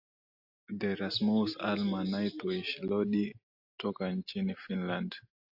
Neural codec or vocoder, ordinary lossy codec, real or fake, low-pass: none; AAC, 48 kbps; real; 5.4 kHz